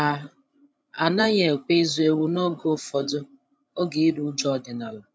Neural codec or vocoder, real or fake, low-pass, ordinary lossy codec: codec, 16 kHz, 16 kbps, FreqCodec, larger model; fake; none; none